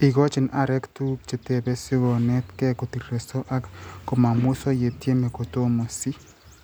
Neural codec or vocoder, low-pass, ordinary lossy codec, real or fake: none; none; none; real